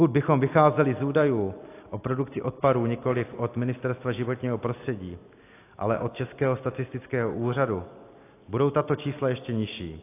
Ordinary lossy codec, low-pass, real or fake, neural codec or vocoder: AAC, 24 kbps; 3.6 kHz; real; none